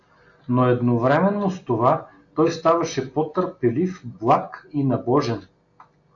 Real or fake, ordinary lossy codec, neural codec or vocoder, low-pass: real; AAC, 48 kbps; none; 7.2 kHz